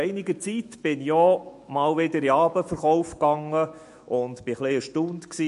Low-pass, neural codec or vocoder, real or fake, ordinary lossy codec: 14.4 kHz; autoencoder, 48 kHz, 128 numbers a frame, DAC-VAE, trained on Japanese speech; fake; MP3, 48 kbps